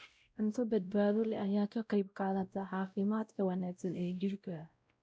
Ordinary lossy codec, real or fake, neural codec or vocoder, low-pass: none; fake; codec, 16 kHz, 0.5 kbps, X-Codec, WavLM features, trained on Multilingual LibriSpeech; none